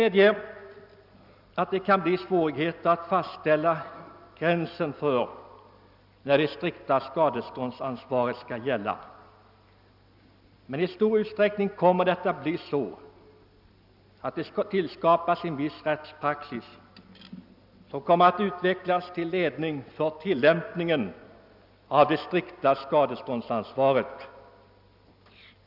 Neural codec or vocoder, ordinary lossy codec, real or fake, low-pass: none; none; real; 5.4 kHz